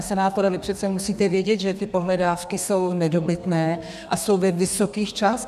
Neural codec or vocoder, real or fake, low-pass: codec, 32 kHz, 1.9 kbps, SNAC; fake; 14.4 kHz